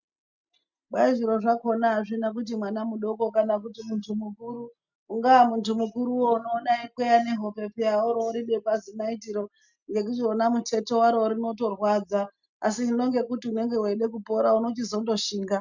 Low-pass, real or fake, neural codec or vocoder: 7.2 kHz; real; none